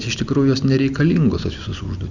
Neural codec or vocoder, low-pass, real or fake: none; 7.2 kHz; real